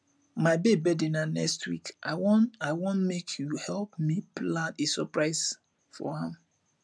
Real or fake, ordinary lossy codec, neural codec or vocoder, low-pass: real; none; none; none